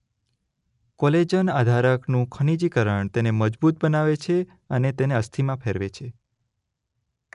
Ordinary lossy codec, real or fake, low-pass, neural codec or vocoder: none; real; 10.8 kHz; none